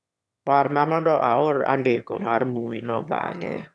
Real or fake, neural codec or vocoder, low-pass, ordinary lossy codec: fake; autoencoder, 22.05 kHz, a latent of 192 numbers a frame, VITS, trained on one speaker; none; none